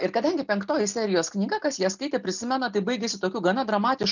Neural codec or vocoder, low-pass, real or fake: none; 7.2 kHz; real